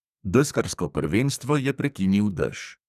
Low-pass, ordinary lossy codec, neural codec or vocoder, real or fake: 14.4 kHz; none; codec, 44.1 kHz, 2.6 kbps, SNAC; fake